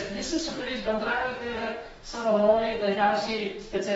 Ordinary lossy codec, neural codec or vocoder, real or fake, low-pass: AAC, 24 kbps; codec, 44.1 kHz, 2.6 kbps, DAC; fake; 19.8 kHz